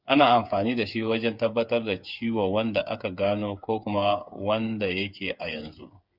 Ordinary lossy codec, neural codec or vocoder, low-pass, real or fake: Opus, 64 kbps; codec, 16 kHz, 8 kbps, FreqCodec, smaller model; 5.4 kHz; fake